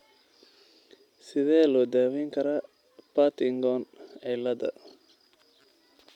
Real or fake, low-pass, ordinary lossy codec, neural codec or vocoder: real; 19.8 kHz; none; none